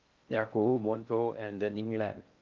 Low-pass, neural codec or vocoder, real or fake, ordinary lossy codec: 7.2 kHz; codec, 16 kHz in and 24 kHz out, 0.6 kbps, FocalCodec, streaming, 4096 codes; fake; Opus, 24 kbps